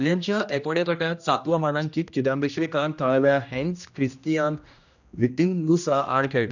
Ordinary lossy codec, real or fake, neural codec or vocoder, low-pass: none; fake; codec, 16 kHz, 1 kbps, X-Codec, HuBERT features, trained on general audio; 7.2 kHz